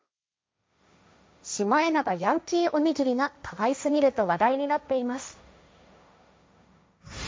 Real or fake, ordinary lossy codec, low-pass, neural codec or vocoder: fake; none; none; codec, 16 kHz, 1.1 kbps, Voila-Tokenizer